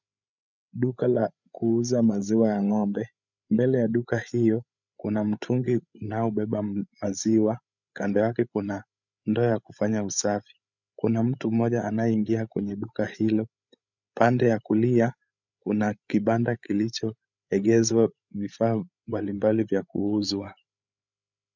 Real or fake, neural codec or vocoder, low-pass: fake; codec, 16 kHz, 8 kbps, FreqCodec, larger model; 7.2 kHz